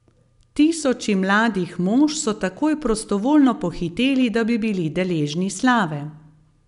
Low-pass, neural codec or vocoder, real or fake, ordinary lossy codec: 10.8 kHz; none; real; none